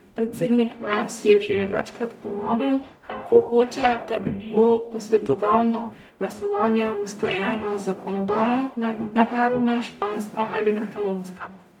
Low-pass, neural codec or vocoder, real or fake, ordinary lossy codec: 19.8 kHz; codec, 44.1 kHz, 0.9 kbps, DAC; fake; none